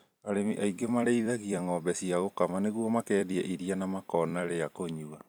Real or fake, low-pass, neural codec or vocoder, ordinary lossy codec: fake; none; vocoder, 44.1 kHz, 128 mel bands every 256 samples, BigVGAN v2; none